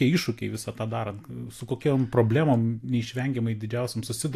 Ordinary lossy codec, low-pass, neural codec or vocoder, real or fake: AAC, 64 kbps; 14.4 kHz; none; real